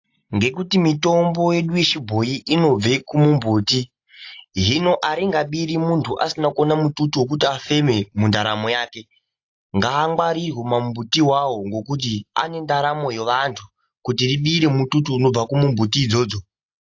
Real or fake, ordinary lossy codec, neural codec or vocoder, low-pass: real; AAC, 48 kbps; none; 7.2 kHz